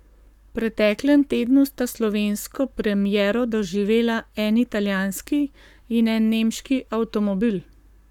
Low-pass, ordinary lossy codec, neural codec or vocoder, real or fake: 19.8 kHz; none; codec, 44.1 kHz, 7.8 kbps, Pupu-Codec; fake